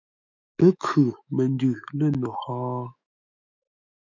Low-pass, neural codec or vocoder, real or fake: 7.2 kHz; autoencoder, 48 kHz, 128 numbers a frame, DAC-VAE, trained on Japanese speech; fake